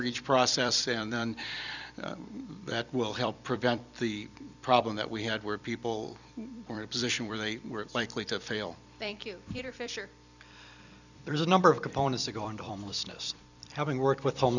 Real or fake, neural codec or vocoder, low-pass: fake; vocoder, 44.1 kHz, 128 mel bands every 256 samples, BigVGAN v2; 7.2 kHz